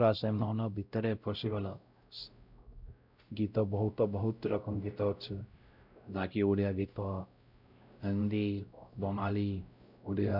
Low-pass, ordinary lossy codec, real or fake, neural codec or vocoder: 5.4 kHz; none; fake; codec, 16 kHz, 0.5 kbps, X-Codec, WavLM features, trained on Multilingual LibriSpeech